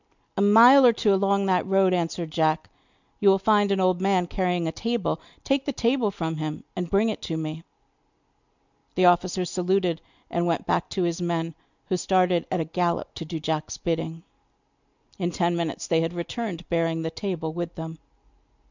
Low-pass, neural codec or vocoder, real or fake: 7.2 kHz; none; real